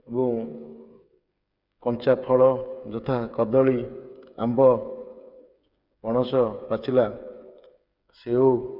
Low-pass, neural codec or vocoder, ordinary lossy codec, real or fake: 5.4 kHz; codec, 16 kHz, 8 kbps, FreqCodec, smaller model; none; fake